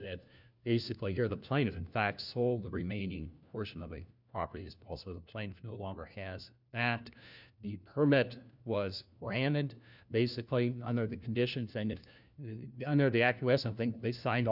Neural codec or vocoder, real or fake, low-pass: codec, 16 kHz, 1 kbps, FunCodec, trained on LibriTTS, 50 frames a second; fake; 5.4 kHz